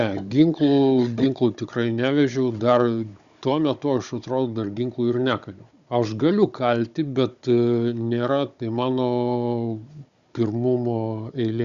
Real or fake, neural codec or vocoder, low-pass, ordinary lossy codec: fake; codec, 16 kHz, 16 kbps, FunCodec, trained on Chinese and English, 50 frames a second; 7.2 kHz; Opus, 64 kbps